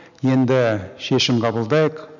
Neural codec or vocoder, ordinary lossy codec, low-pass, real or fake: none; none; 7.2 kHz; real